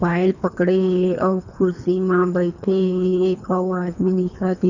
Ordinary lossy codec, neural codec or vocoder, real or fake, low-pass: none; codec, 24 kHz, 3 kbps, HILCodec; fake; 7.2 kHz